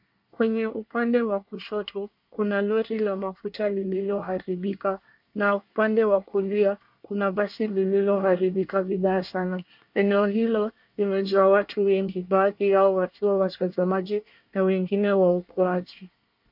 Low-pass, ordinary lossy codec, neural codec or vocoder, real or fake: 5.4 kHz; MP3, 48 kbps; codec, 24 kHz, 1 kbps, SNAC; fake